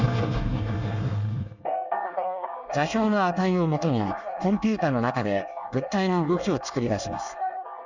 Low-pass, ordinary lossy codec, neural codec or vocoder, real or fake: 7.2 kHz; none; codec, 24 kHz, 1 kbps, SNAC; fake